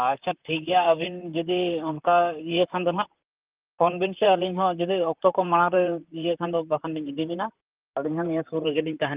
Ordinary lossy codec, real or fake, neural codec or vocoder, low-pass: Opus, 32 kbps; fake; vocoder, 44.1 kHz, 128 mel bands, Pupu-Vocoder; 3.6 kHz